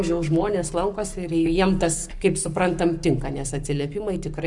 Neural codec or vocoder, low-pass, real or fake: codec, 44.1 kHz, 7.8 kbps, Pupu-Codec; 10.8 kHz; fake